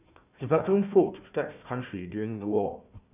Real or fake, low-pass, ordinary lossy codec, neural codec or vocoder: fake; 3.6 kHz; none; codec, 16 kHz, 1 kbps, FunCodec, trained on Chinese and English, 50 frames a second